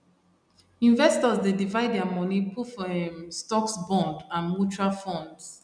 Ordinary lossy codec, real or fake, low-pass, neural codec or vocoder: none; real; 9.9 kHz; none